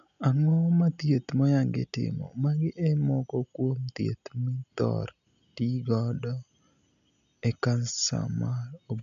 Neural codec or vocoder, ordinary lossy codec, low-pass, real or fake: none; none; 7.2 kHz; real